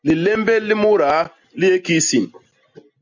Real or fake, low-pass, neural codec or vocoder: real; 7.2 kHz; none